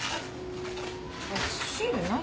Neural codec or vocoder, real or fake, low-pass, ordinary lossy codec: none; real; none; none